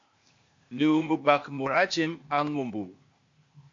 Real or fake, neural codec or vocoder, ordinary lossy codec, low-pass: fake; codec, 16 kHz, 0.8 kbps, ZipCodec; MP3, 48 kbps; 7.2 kHz